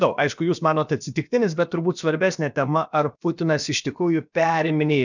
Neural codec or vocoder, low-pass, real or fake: codec, 16 kHz, about 1 kbps, DyCAST, with the encoder's durations; 7.2 kHz; fake